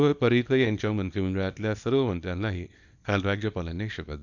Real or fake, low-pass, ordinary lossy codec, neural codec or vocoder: fake; 7.2 kHz; none; codec, 24 kHz, 0.9 kbps, WavTokenizer, small release